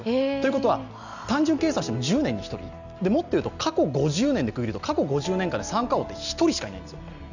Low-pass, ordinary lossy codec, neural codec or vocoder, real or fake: 7.2 kHz; none; none; real